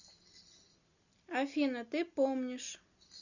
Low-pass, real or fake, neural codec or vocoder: 7.2 kHz; real; none